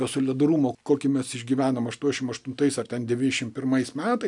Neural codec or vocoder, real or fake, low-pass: none; real; 10.8 kHz